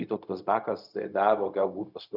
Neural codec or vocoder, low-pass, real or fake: codec, 16 kHz, 0.4 kbps, LongCat-Audio-Codec; 5.4 kHz; fake